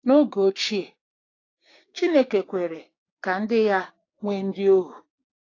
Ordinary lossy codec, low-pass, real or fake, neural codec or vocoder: AAC, 32 kbps; 7.2 kHz; fake; codec, 16 kHz, 6 kbps, DAC